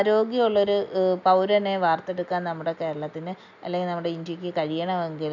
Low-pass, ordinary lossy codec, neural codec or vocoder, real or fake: 7.2 kHz; none; none; real